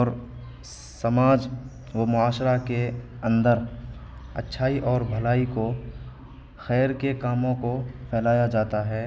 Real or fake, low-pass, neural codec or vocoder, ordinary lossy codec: real; none; none; none